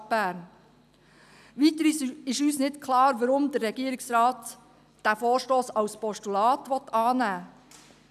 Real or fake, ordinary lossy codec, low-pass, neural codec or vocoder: real; none; 14.4 kHz; none